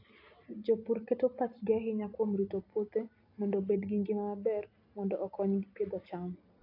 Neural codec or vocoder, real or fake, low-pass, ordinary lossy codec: none; real; 5.4 kHz; AAC, 32 kbps